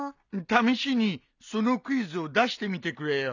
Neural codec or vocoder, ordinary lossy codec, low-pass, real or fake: none; none; 7.2 kHz; real